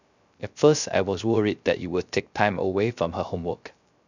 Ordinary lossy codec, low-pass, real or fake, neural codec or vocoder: none; 7.2 kHz; fake; codec, 16 kHz, 0.3 kbps, FocalCodec